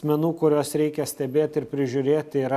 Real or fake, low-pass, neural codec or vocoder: real; 14.4 kHz; none